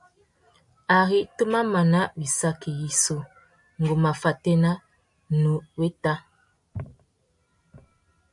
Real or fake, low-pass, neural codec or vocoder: real; 10.8 kHz; none